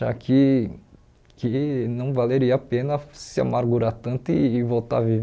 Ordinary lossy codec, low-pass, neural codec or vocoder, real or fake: none; none; none; real